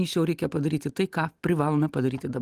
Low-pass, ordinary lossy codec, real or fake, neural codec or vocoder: 14.4 kHz; Opus, 24 kbps; real; none